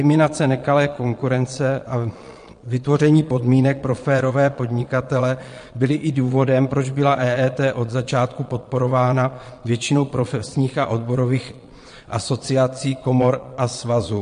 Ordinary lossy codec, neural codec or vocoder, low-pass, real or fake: MP3, 48 kbps; vocoder, 22.05 kHz, 80 mel bands, Vocos; 9.9 kHz; fake